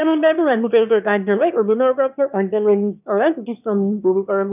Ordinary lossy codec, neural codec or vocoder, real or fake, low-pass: none; autoencoder, 22.05 kHz, a latent of 192 numbers a frame, VITS, trained on one speaker; fake; 3.6 kHz